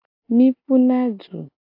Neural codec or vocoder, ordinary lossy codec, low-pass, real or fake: none; AAC, 24 kbps; 5.4 kHz; real